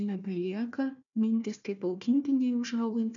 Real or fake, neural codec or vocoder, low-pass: fake; codec, 16 kHz, 1 kbps, FunCodec, trained on Chinese and English, 50 frames a second; 7.2 kHz